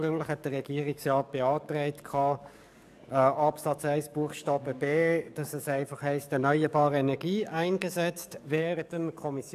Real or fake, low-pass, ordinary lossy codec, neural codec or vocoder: fake; 14.4 kHz; none; codec, 44.1 kHz, 7.8 kbps, DAC